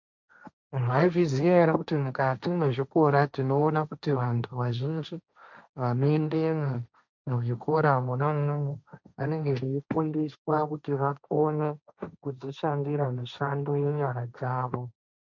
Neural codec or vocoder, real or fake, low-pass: codec, 16 kHz, 1.1 kbps, Voila-Tokenizer; fake; 7.2 kHz